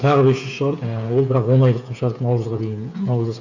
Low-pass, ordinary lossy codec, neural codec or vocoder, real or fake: 7.2 kHz; none; codec, 16 kHz, 8 kbps, FreqCodec, smaller model; fake